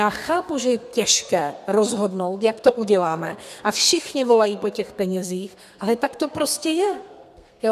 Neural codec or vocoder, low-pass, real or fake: codec, 32 kHz, 1.9 kbps, SNAC; 14.4 kHz; fake